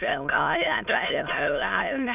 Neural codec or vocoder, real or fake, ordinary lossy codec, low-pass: autoencoder, 22.05 kHz, a latent of 192 numbers a frame, VITS, trained on many speakers; fake; none; 3.6 kHz